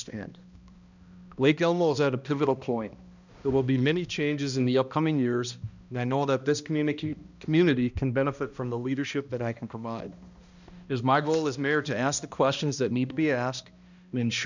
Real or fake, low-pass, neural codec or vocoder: fake; 7.2 kHz; codec, 16 kHz, 1 kbps, X-Codec, HuBERT features, trained on balanced general audio